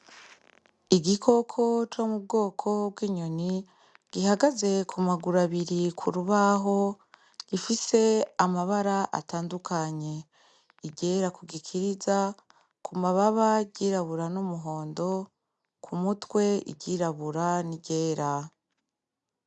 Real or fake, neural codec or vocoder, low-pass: real; none; 10.8 kHz